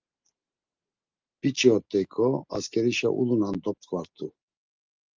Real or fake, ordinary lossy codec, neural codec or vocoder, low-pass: real; Opus, 32 kbps; none; 7.2 kHz